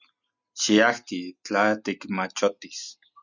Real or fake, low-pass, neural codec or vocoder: real; 7.2 kHz; none